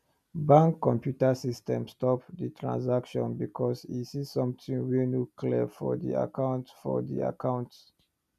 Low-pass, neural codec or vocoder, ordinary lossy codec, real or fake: 14.4 kHz; none; none; real